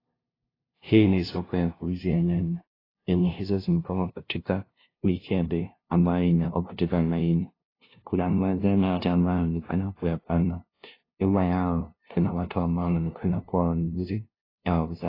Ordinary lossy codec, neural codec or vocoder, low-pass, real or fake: AAC, 24 kbps; codec, 16 kHz, 0.5 kbps, FunCodec, trained on LibriTTS, 25 frames a second; 5.4 kHz; fake